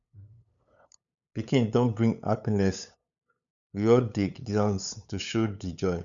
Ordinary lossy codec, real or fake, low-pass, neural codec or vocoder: none; fake; 7.2 kHz; codec, 16 kHz, 8 kbps, FunCodec, trained on LibriTTS, 25 frames a second